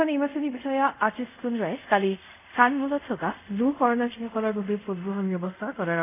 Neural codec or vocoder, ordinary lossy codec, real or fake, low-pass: codec, 24 kHz, 0.5 kbps, DualCodec; none; fake; 3.6 kHz